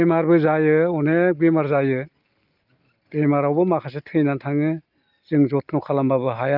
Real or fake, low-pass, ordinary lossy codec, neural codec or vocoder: real; 5.4 kHz; Opus, 24 kbps; none